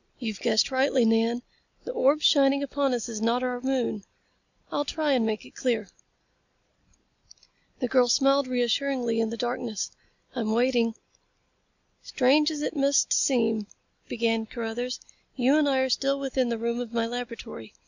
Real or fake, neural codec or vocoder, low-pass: real; none; 7.2 kHz